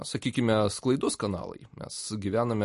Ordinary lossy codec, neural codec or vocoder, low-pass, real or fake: MP3, 48 kbps; none; 14.4 kHz; real